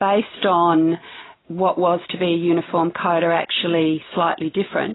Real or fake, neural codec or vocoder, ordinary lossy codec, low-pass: real; none; AAC, 16 kbps; 7.2 kHz